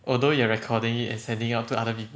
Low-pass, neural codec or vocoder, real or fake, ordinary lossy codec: none; none; real; none